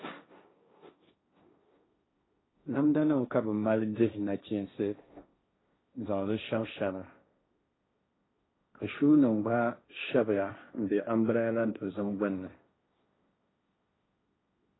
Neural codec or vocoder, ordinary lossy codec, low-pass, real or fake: codec, 16 kHz, 1.1 kbps, Voila-Tokenizer; AAC, 16 kbps; 7.2 kHz; fake